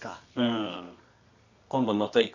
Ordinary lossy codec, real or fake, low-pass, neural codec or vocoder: none; fake; 7.2 kHz; codec, 24 kHz, 0.9 kbps, WavTokenizer, medium music audio release